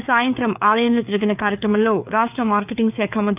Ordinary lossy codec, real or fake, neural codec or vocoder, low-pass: AAC, 32 kbps; fake; codec, 16 kHz, 4 kbps, FreqCodec, larger model; 3.6 kHz